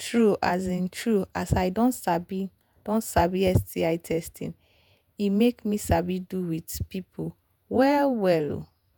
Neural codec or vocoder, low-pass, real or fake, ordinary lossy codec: vocoder, 48 kHz, 128 mel bands, Vocos; none; fake; none